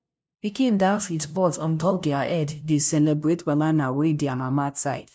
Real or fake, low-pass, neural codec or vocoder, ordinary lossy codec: fake; none; codec, 16 kHz, 0.5 kbps, FunCodec, trained on LibriTTS, 25 frames a second; none